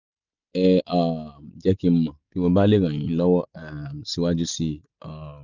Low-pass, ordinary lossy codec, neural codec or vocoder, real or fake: 7.2 kHz; none; none; real